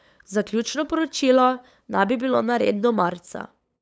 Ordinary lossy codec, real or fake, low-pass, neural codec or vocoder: none; fake; none; codec, 16 kHz, 8 kbps, FunCodec, trained on LibriTTS, 25 frames a second